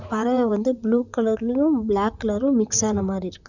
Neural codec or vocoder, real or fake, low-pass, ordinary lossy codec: vocoder, 44.1 kHz, 128 mel bands, Pupu-Vocoder; fake; 7.2 kHz; none